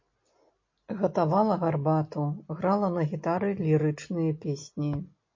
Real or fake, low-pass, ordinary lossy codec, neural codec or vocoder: real; 7.2 kHz; MP3, 32 kbps; none